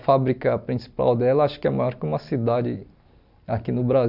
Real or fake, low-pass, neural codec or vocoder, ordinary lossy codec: real; 5.4 kHz; none; none